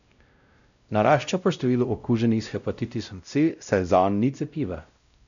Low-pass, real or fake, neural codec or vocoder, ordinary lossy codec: 7.2 kHz; fake; codec, 16 kHz, 0.5 kbps, X-Codec, WavLM features, trained on Multilingual LibriSpeech; none